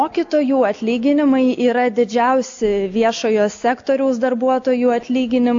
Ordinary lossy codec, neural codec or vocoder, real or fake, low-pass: AAC, 48 kbps; none; real; 7.2 kHz